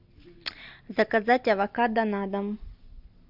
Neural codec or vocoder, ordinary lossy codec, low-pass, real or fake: none; AAC, 48 kbps; 5.4 kHz; real